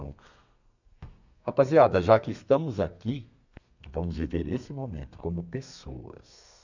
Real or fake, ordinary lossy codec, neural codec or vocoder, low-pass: fake; none; codec, 32 kHz, 1.9 kbps, SNAC; 7.2 kHz